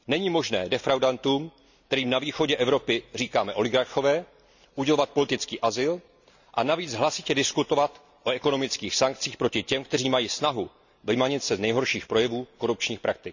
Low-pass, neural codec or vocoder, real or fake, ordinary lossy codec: 7.2 kHz; none; real; none